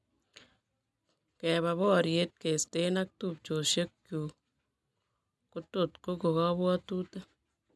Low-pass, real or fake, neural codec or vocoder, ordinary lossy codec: none; real; none; none